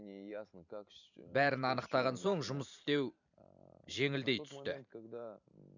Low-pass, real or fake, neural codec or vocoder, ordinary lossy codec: 7.2 kHz; fake; vocoder, 44.1 kHz, 128 mel bands every 512 samples, BigVGAN v2; none